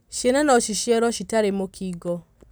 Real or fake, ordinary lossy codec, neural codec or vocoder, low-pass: real; none; none; none